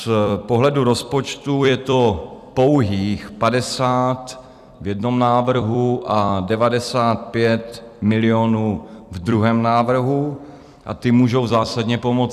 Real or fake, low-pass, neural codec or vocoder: fake; 14.4 kHz; vocoder, 44.1 kHz, 128 mel bands every 256 samples, BigVGAN v2